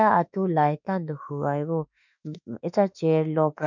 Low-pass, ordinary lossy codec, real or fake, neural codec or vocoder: 7.2 kHz; none; fake; autoencoder, 48 kHz, 32 numbers a frame, DAC-VAE, trained on Japanese speech